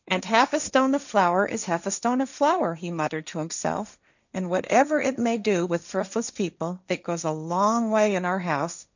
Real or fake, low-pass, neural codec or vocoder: fake; 7.2 kHz; codec, 16 kHz, 1.1 kbps, Voila-Tokenizer